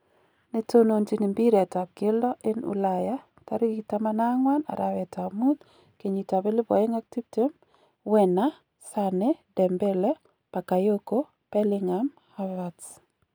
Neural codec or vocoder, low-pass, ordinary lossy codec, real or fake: none; none; none; real